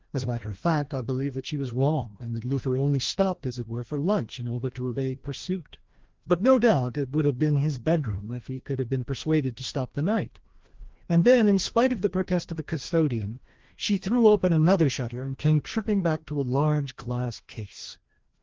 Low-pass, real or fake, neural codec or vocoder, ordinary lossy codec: 7.2 kHz; fake; codec, 16 kHz, 1 kbps, FreqCodec, larger model; Opus, 16 kbps